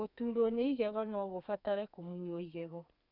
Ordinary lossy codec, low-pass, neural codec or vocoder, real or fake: none; 5.4 kHz; codec, 32 kHz, 1.9 kbps, SNAC; fake